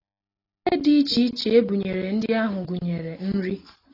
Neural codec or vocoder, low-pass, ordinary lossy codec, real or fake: none; 5.4 kHz; AAC, 24 kbps; real